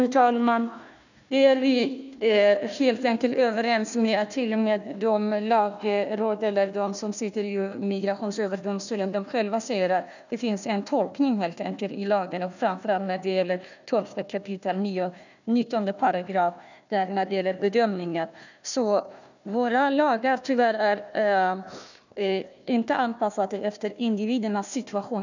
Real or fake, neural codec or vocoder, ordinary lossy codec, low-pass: fake; codec, 16 kHz, 1 kbps, FunCodec, trained on Chinese and English, 50 frames a second; none; 7.2 kHz